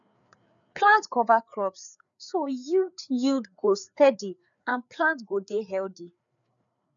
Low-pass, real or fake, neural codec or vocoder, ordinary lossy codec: 7.2 kHz; fake; codec, 16 kHz, 4 kbps, FreqCodec, larger model; none